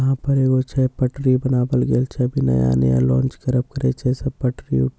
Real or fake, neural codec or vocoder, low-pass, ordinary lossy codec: real; none; none; none